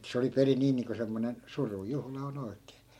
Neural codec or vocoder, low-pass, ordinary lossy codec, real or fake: none; 19.8 kHz; MP3, 64 kbps; real